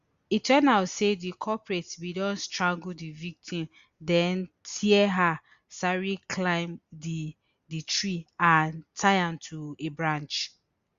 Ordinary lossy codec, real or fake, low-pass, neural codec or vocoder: Opus, 64 kbps; real; 7.2 kHz; none